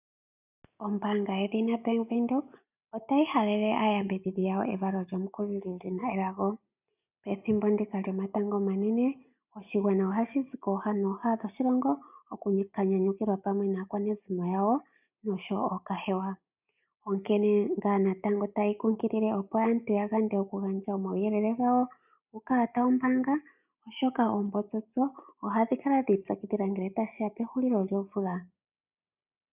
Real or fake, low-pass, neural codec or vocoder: real; 3.6 kHz; none